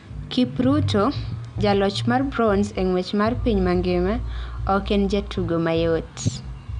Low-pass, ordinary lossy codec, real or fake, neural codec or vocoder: 9.9 kHz; none; real; none